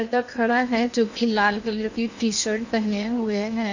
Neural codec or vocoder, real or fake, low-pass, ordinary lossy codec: codec, 16 kHz in and 24 kHz out, 0.8 kbps, FocalCodec, streaming, 65536 codes; fake; 7.2 kHz; none